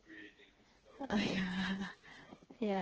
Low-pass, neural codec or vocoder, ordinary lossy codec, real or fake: 7.2 kHz; codec, 16 kHz, 4 kbps, FreqCodec, smaller model; Opus, 16 kbps; fake